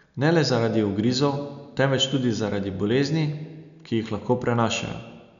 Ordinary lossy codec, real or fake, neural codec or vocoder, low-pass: none; real; none; 7.2 kHz